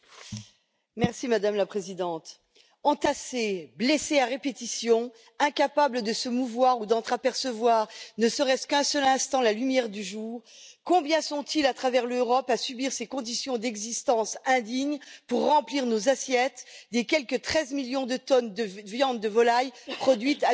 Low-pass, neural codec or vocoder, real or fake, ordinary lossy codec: none; none; real; none